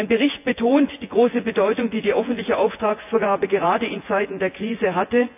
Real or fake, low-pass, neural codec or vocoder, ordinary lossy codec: fake; 3.6 kHz; vocoder, 24 kHz, 100 mel bands, Vocos; AAC, 32 kbps